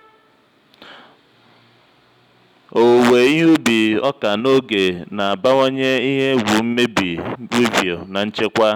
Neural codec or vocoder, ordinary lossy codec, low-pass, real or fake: none; none; 19.8 kHz; real